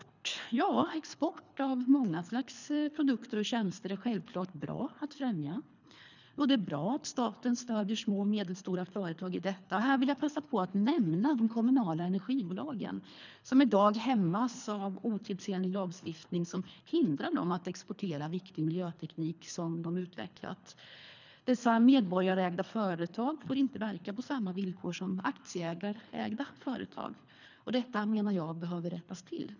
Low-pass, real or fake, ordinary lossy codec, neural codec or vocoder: 7.2 kHz; fake; none; codec, 24 kHz, 3 kbps, HILCodec